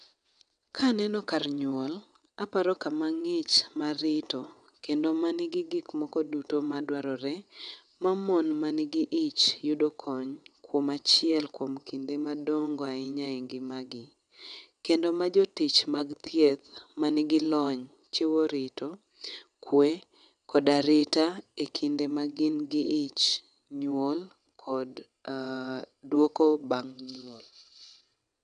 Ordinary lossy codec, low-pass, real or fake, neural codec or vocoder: none; 9.9 kHz; fake; vocoder, 22.05 kHz, 80 mel bands, WaveNeXt